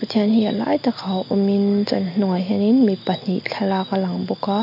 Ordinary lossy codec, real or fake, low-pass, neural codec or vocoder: MP3, 32 kbps; real; 5.4 kHz; none